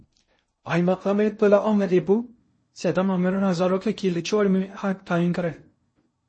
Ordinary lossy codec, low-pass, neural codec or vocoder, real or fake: MP3, 32 kbps; 9.9 kHz; codec, 16 kHz in and 24 kHz out, 0.6 kbps, FocalCodec, streaming, 2048 codes; fake